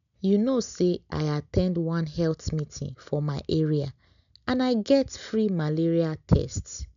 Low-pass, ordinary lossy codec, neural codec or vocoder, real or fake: 7.2 kHz; MP3, 96 kbps; none; real